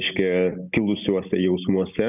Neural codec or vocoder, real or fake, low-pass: none; real; 3.6 kHz